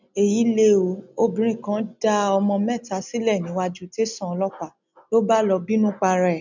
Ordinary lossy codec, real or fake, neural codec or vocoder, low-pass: none; real; none; 7.2 kHz